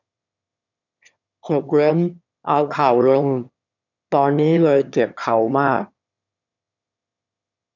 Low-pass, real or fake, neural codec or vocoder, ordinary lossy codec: 7.2 kHz; fake; autoencoder, 22.05 kHz, a latent of 192 numbers a frame, VITS, trained on one speaker; none